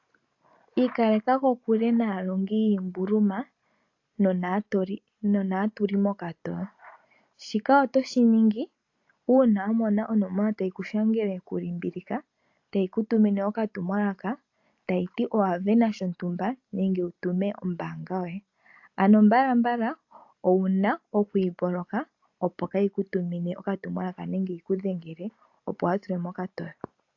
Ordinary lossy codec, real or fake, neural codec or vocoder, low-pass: AAC, 48 kbps; real; none; 7.2 kHz